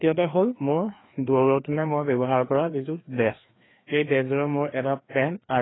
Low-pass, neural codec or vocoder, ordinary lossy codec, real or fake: 7.2 kHz; codec, 16 kHz, 2 kbps, FreqCodec, larger model; AAC, 16 kbps; fake